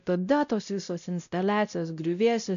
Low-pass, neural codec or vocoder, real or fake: 7.2 kHz; codec, 16 kHz, 0.5 kbps, X-Codec, WavLM features, trained on Multilingual LibriSpeech; fake